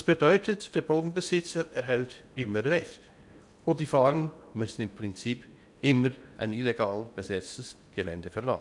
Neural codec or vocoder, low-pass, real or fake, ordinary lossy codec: codec, 16 kHz in and 24 kHz out, 0.8 kbps, FocalCodec, streaming, 65536 codes; 10.8 kHz; fake; MP3, 96 kbps